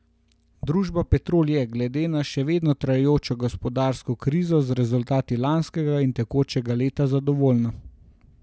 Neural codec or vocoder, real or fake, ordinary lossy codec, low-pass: none; real; none; none